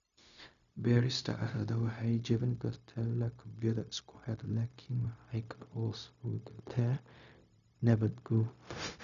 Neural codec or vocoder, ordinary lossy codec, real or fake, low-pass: codec, 16 kHz, 0.4 kbps, LongCat-Audio-Codec; none; fake; 7.2 kHz